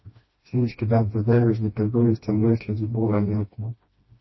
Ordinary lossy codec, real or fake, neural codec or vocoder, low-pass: MP3, 24 kbps; fake; codec, 16 kHz, 1 kbps, FreqCodec, smaller model; 7.2 kHz